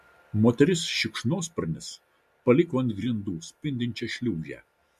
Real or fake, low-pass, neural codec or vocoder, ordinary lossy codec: real; 14.4 kHz; none; MP3, 64 kbps